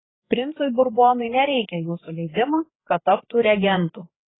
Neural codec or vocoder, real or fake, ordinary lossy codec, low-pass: codec, 16 kHz, 4 kbps, FreqCodec, larger model; fake; AAC, 16 kbps; 7.2 kHz